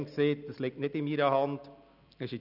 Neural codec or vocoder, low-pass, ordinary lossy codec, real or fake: none; 5.4 kHz; none; real